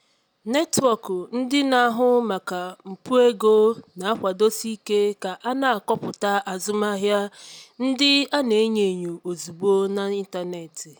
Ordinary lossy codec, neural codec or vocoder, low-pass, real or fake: none; none; none; real